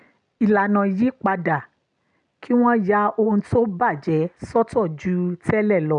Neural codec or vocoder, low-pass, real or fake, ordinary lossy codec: none; 9.9 kHz; real; none